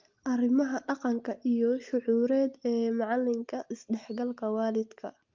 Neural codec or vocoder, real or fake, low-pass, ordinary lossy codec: none; real; 7.2 kHz; Opus, 24 kbps